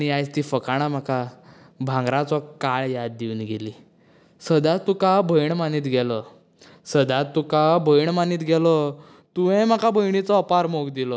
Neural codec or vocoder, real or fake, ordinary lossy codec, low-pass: none; real; none; none